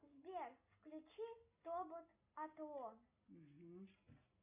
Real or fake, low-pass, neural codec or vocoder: real; 3.6 kHz; none